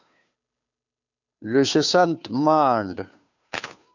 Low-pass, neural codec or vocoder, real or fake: 7.2 kHz; codec, 16 kHz, 2 kbps, FunCodec, trained on Chinese and English, 25 frames a second; fake